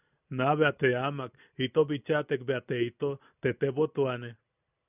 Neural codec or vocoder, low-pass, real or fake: none; 3.6 kHz; real